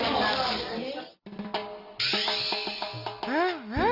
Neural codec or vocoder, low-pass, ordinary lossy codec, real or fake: none; 5.4 kHz; Opus, 32 kbps; real